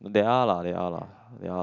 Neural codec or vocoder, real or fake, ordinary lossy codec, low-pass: none; real; none; 7.2 kHz